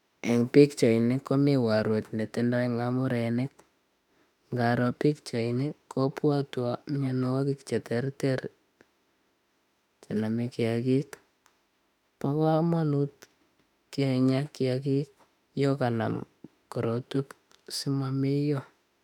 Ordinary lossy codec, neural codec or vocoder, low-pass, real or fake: none; autoencoder, 48 kHz, 32 numbers a frame, DAC-VAE, trained on Japanese speech; 19.8 kHz; fake